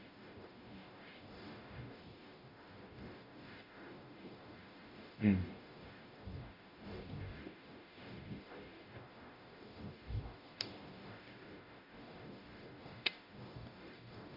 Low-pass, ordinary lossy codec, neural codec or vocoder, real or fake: 5.4 kHz; none; codec, 44.1 kHz, 0.9 kbps, DAC; fake